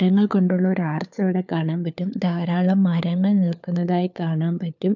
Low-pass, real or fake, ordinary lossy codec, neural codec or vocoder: 7.2 kHz; fake; none; codec, 16 kHz, 4 kbps, FunCodec, trained on LibriTTS, 50 frames a second